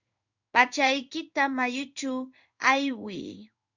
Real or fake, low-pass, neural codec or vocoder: fake; 7.2 kHz; codec, 16 kHz in and 24 kHz out, 1 kbps, XY-Tokenizer